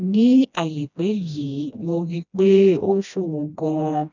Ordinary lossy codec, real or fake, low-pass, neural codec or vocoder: none; fake; 7.2 kHz; codec, 16 kHz, 1 kbps, FreqCodec, smaller model